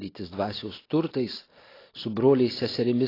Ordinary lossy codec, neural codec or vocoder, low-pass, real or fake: AAC, 24 kbps; none; 5.4 kHz; real